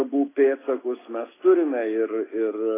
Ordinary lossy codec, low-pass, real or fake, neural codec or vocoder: AAC, 16 kbps; 3.6 kHz; real; none